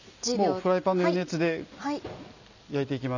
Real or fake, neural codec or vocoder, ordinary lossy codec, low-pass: real; none; none; 7.2 kHz